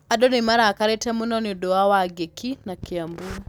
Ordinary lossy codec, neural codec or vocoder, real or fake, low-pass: none; none; real; none